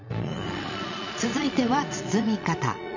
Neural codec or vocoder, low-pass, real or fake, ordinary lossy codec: vocoder, 22.05 kHz, 80 mel bands, Vocos; 7.2 kHz; fake; none